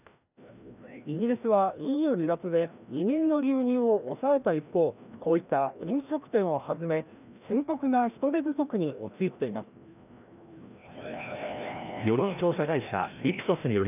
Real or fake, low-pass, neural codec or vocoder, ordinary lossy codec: fake; 3.6 kHz; codec, 16 kHz, 1 kbps, FreqCodec, larger model; none